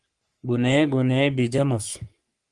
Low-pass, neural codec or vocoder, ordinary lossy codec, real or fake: 10.8 kHz; codec, 44.1 kHz, 3.4 kbps, Pupu-Codec; Opus, 32 kbps; fake